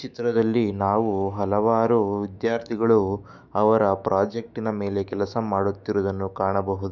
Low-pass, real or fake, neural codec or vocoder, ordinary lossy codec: 7.2 kHz; fake; autoencoder, 48 kHz, 128 numbers a frame, DAC-VAE, trained on Japanese speech; none